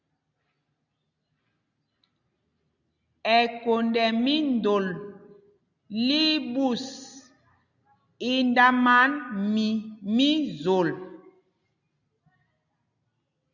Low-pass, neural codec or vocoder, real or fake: 7.2 kHz; none; real